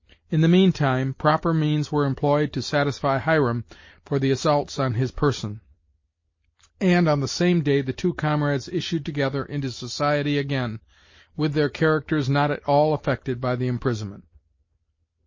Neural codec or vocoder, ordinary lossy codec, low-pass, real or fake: none; MP3, 32 kbps; 7.2 kHz; real